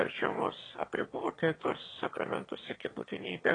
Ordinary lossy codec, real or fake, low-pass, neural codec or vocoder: AAC, 32 kbps; fake; 9.9 kHz; autoencoder, 22.05 kHz, a latent of 192 numbers a frame, VITS, trained on one speaker